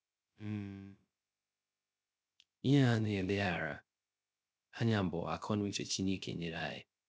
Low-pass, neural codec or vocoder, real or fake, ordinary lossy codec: none; codec, 16 kHz, 0.3 kbps, FocalCodec; fake; none